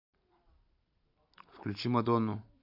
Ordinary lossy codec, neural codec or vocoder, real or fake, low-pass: AAC, 48 kbps; vocoder, 44.1 kHz, 128 mel bands every 512 samples, BigVGAN v2; fake; 5.4 kHz